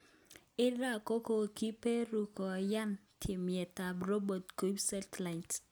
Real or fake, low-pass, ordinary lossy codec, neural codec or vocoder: real; none; none; none